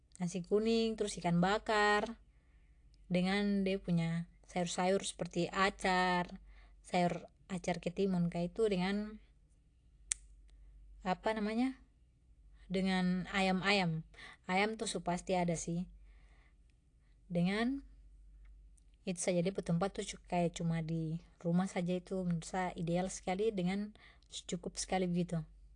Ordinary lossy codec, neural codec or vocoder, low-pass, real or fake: AAC, 48 kbps; none; 9.9 kHz; real